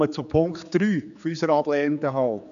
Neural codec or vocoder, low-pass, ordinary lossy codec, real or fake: codec, 16 kHz, 4 kbps, X-Codec, HuBERT features, trained on general audio; 7.2 kHz; none; fake